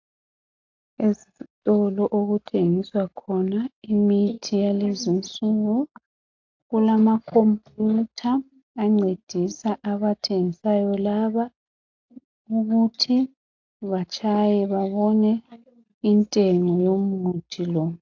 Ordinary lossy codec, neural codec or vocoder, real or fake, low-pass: AAC, 48 kbps; none; real; 7.2 kHz